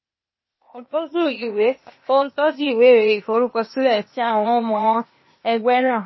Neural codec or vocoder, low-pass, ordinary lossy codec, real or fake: codec, 16 kHz, 0.8 kbps, ZipCodec; 7.2 kHz; MP3, 24 kbps; fake